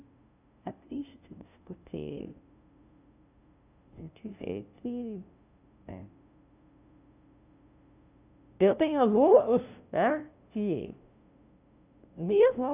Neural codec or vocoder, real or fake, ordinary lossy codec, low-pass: codec, 16 kHz, 0.5 kbps, FunCodec, trained on LibriTTS, 25 frames a second; fake; none; 3.6 kHz